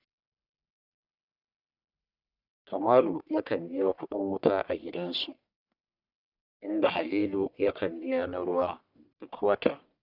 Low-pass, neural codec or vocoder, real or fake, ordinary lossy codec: 5.4 kHz; codec, 44.1 kHz, 1.7 kbps, Pupu-Codec; fake; none